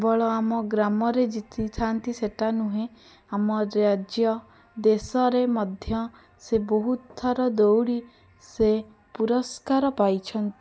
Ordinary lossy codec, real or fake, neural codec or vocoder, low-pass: none; real; none; none